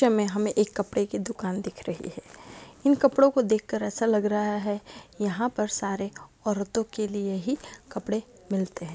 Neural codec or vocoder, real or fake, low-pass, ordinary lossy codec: none; real; none; none